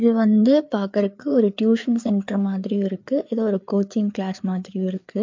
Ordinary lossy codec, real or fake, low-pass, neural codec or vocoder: MP3, 48 kbps; fake; 7.2 kHz; codec, 16 kHz, 4 kbps, FreqCodec, larger model